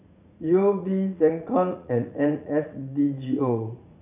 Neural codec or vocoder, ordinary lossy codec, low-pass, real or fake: vocoder, 44.1 kHz, 80 mel bands, Vocos; none; 3.6 kHz; fake